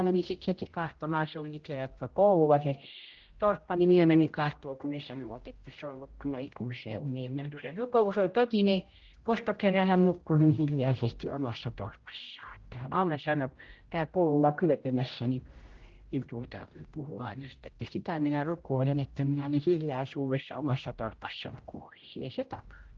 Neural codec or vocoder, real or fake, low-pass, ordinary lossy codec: codec, 16 kHz, 0.5 kbps, X-Codec, HuBERT features, trained on general audio; fake; 7.2 kHz; Opus, 16 kbps